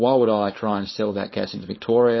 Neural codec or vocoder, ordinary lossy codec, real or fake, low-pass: codec, 16 kHz, 4.8 kbps, FACodec; MP3, 24 kbps; fake; 7.2 kHz